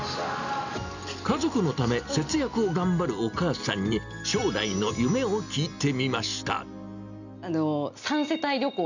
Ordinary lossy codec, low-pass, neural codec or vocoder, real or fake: none; 7.2 kHz; none; real